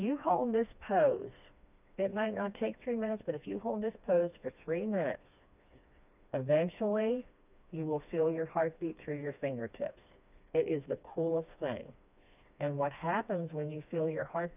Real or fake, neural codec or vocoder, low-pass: fake; codec, 16 kHz, 2 kbps, FreqCodec, smaller model; 3.6 kHz